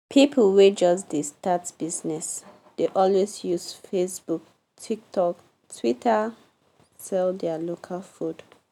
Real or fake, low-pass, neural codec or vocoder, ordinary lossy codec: real; 19.8 kHz; none; none